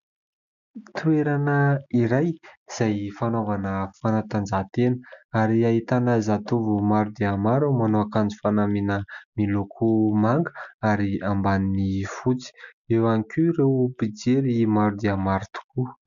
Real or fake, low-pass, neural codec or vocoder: real; 7.2 kHz; none